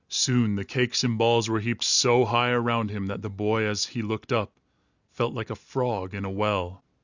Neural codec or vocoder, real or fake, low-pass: none; real; 7.2 kHz